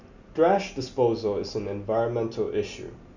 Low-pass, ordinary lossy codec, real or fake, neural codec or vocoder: 7.2 kHz; none; real; none